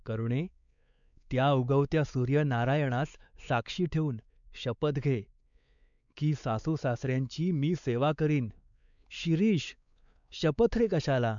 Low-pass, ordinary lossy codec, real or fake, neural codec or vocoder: 7.2 kHz; none; fake; codec, 16 kHz, 4 kbps, X-Codec, WavLM features, trained on Multilingual LibriSpeech